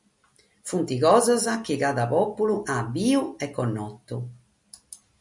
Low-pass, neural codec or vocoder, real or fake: 10.8 kHz; none; real